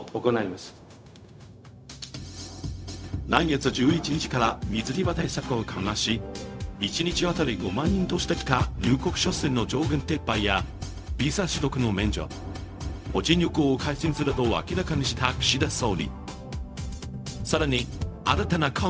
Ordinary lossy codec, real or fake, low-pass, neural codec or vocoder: none; fake; none; codec, 16 kHz, 0.4 kbps, LongCat-Audio-Codec